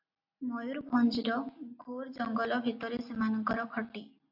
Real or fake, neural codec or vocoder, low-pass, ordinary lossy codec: real; none; 5.4 kHz; AAC, 48 kbps